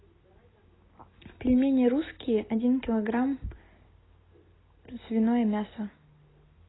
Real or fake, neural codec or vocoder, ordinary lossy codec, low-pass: real; none; AAC, 16 kbps; 7.2 kHz